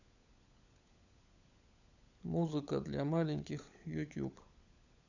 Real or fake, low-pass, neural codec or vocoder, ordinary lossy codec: fake; 7.2 kHz; codec, 16 kHz, 16 kbps, FunCodec, trained on LibriTTS, 50 frames a second; none